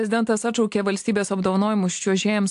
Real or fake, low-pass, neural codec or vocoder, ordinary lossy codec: real; 10.8 kHz; none; AAC, 64 kbps